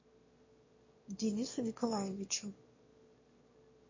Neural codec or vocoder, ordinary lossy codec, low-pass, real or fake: autoencoder, 22.05 kHz, a latent of 192 numbers a frame, VITS, trained on one speaker; MP3, 32 kbps; 7.2 kHz; fake